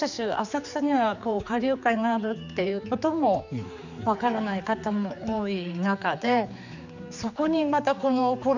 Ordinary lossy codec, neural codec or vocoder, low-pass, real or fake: none; codec, 16 kHz, 4 kbps, X-Codec, HuBERT features, trained on general audio; 7.2 kHz; fake